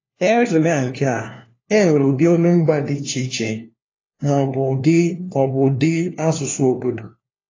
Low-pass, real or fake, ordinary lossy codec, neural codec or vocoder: 7.2 kHz; fake; AAC, 32 kbps; codec, 16 kHz, 1 kbps, FunCodec, trained on LibriTTS, 50 frames a second